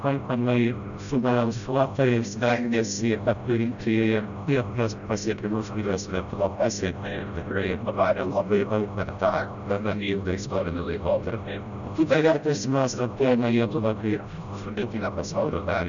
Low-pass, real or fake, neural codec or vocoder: 7.2 kHz; fake; codec, 16 kHz, 0.5 kbps, FreqCodec, smaller model